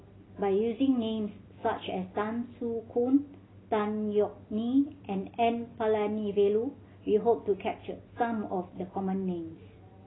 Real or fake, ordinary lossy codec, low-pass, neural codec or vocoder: real; AAC, 16 kbps; 7.2 kHz; none